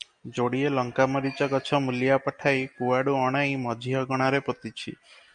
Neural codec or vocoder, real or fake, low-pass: none; real; 9.9 kHz